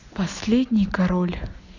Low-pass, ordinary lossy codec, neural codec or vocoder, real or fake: 7.2 kHz; none; none; real